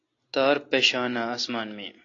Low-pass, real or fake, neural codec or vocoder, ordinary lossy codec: 7.2 kHz; real; none; AAC, 32 kbps